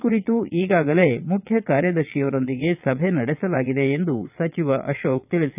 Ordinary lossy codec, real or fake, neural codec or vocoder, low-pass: none; fake; vocoder, 22.05 kHz, 80 mel bands, Vocos; 3.6 kHz